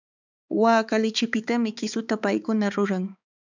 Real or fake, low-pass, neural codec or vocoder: fake; 7.2 kHz; codec, 16 kHz, 4 kbps, X-Codec, HuBERT features, trained on balanced general audio